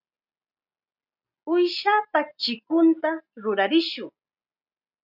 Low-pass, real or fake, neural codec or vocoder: 5.4 kHz; real; none